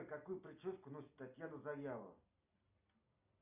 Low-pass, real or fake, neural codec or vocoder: 3.6 kHz; real; none